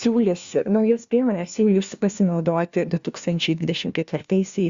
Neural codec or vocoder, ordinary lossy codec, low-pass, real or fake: codec, 16 kHz, 1 kbps, FunCodec, trained on LibriTTS, 50 frames a second; Opus, 64 kbps; 7.2 kHz; fake